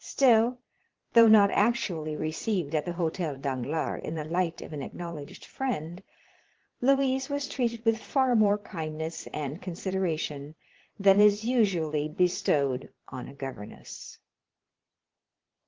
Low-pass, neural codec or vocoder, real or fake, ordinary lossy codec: 7.2 kHz; vocoder, 22.05 kHz, 80 mel bands, Vocos; fake; Opus, 16 kbps